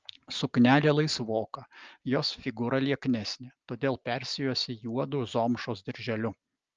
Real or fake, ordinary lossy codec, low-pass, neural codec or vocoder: real; Opus, 24 kbps; 7.2 kHz; none